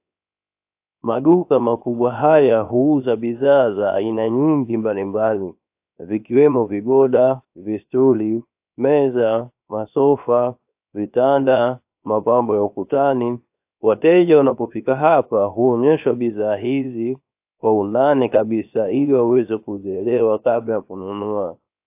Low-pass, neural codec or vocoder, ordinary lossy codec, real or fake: 3.6 kHz; codec, 16 kHz, 0.7 kbps, FocalCodec; AAC, 32 kbps; fake